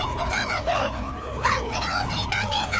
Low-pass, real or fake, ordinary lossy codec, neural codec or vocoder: none; fake; none; codec, 16 kHz, 2 kbps, FreqCodec, larger model